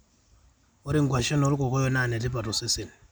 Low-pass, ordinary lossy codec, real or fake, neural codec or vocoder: none; none; real; none